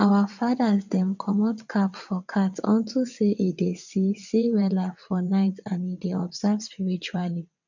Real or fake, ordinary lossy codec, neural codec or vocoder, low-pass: fake; none; vocoder, 22.05 kHz, 80 mel bands, WaveNeXt; 7.2 kHz